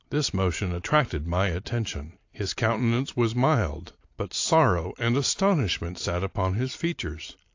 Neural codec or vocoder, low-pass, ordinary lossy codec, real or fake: none; 7.2 kHz; AAC, 48 kbps; real